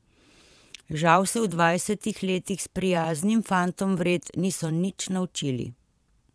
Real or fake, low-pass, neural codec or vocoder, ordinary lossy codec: fake; none; vocoder, 22.05 kHz, 80 mel bands, Vocos; none